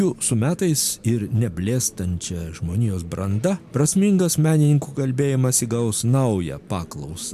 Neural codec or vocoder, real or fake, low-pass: none; real; 14.4 kHz